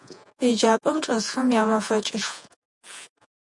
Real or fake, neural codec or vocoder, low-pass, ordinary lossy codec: fake; vocoder, 48 kHz, 128 mel bands, Vocos; 10.8 kHz; MP3, 64 kbps